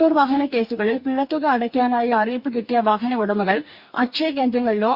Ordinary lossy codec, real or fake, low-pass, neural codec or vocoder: Opus, 64 kbps; fake; 5.4 kHz; codec, 44.1 kHz, 2.6 kbps, DAC